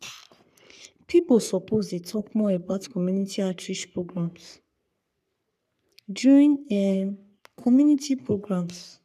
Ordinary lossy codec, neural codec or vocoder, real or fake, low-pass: none; codec, 44.1 kHz, 3.4 kbps, Pupu-Codec; fake; 14.4 kHz